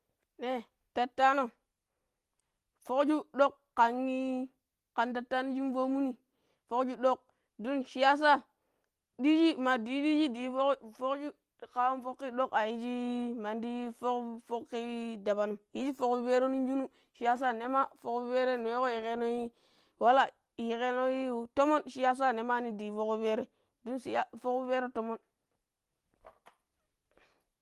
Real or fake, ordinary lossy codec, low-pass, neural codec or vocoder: real; Opus, 24 kbps; 14.4 kHz; none